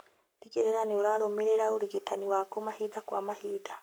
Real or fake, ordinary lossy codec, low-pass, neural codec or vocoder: fake; none; none; codec, 44.1 kHz, 7.8 kbps, Pupu-Codec